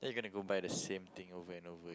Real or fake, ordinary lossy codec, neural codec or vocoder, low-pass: real; none; none; none